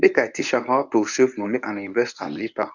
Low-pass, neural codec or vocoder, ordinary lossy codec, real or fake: 7.2 kHz; codec, 24 kHz, 0.9 kbps, WavTokenizer, medium speech release version 1; none; fake